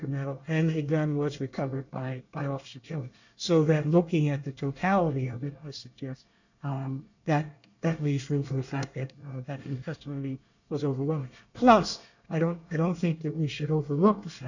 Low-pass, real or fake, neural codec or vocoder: 7.2 kHz; fake; codec, 24 kHz, 1 kbps, SNAC